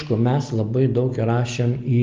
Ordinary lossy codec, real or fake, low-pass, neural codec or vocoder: Opus, 24 kbps; real; 7.2 kHz; none